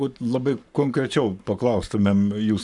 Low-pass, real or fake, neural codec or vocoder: 10.8 kHz; fake; codec, 44.1 kHz, 7.8 kbps, Pupu-Codec